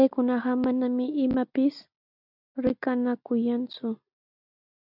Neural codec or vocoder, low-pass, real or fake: none; 5.4 kHz; real